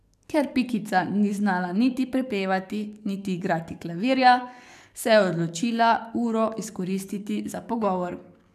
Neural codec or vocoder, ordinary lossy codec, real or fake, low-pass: codec, 44.1 kHz, 7.8 kbps, DAC; none; fake; 14.4 kHz